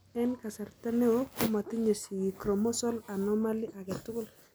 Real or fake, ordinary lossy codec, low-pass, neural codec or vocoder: real; none; none; none